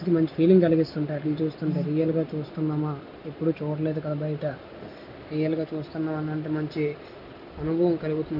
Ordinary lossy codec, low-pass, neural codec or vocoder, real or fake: none; 5.4 kHz; none; real